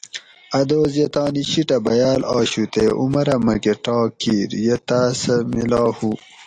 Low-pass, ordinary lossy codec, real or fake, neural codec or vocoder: 9.9 kHz; AAC, 48 kbps; real; none